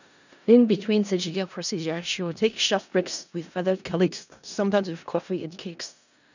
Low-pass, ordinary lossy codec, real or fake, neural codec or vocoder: 7.2 kHz; none; fake; codec, 16 kHz in and 24 kHz out, 0.4 kbps, LongCat-Audio-Codec, four codebook decoder